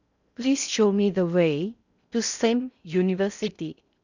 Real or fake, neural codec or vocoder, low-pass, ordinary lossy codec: fake; codec, 16 kHz in and 24 kHz out, 0.6 kbps, FocalCodec, streaming, 4096 codes; 7.2 kHz; none